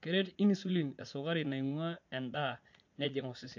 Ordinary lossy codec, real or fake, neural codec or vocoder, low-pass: MP3, 48 kbps; fake; vocoder, 44.1 kHz, 80 mel bands, Vocos; 7.2 kHz